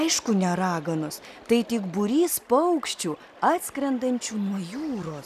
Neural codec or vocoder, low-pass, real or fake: none; 14.4 kHz; real